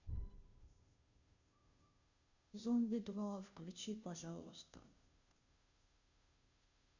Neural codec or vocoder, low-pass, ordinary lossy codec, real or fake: codec, 16 kHz, 0.5 kbps, FunCodec, trained on Chinese and English, 25 frames a second; 7.2 kHz; none; fake